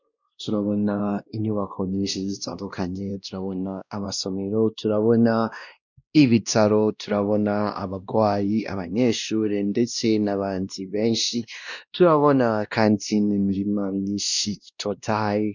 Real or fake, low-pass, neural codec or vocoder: fake; 7.2 kHz; codec, 16 kHz, 1 kbps, X-Codec, WavLM features, trained on Multilingual LibriSpeech